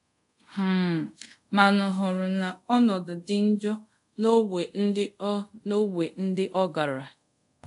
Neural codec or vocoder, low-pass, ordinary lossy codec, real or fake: codec, 24 kHz, 0.5 kbps, DualCodec; 10.8 kHz; none; fake